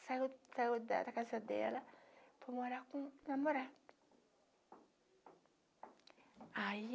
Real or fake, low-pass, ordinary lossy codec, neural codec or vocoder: real; none; none; none